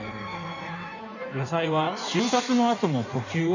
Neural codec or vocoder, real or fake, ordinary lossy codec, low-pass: codec, 16 kHz in and 24 kHz out, 1.1 kbps, FireRedTTS-2 codec; fake; none; 7.2 kHz